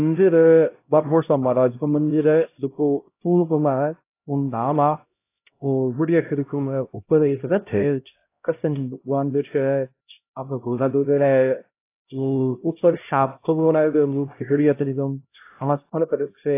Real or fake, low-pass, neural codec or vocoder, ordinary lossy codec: fake; 3.6 kHz; codec, 16 kHz, 0.5 kbps, X-Codec, HuBERT features, trained on LibriSpeech; AAC, 24 kbps